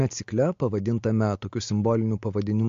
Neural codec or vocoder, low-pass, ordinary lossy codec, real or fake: none; 7.2 kHz; MP3, 48 kbps; real